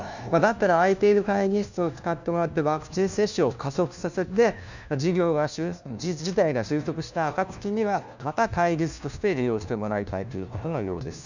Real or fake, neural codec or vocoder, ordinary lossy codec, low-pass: fake; codec, 16 kHz, 1 kbps, FunCodec, trained on LibriTTS, 50 frames a second; none; 7.2 kHz